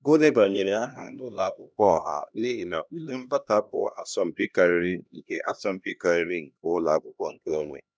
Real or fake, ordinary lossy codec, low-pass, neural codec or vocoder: fake; none; none; codec, 16 kHz, 1 kbps, X-Codec, HuBERT features, trained on LibriSpeech